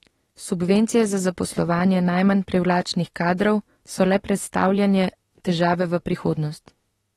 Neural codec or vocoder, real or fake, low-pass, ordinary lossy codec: autoencoder, 48 kHz, 32 numbers a frame, DAC-VAE, trained on Japanese speech; fake; 19.8 kHz; AAC, 32 kbps